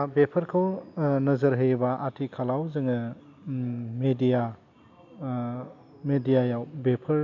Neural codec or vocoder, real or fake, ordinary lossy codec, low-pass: none; real; AAC, 48 kbps; 7.2 kHz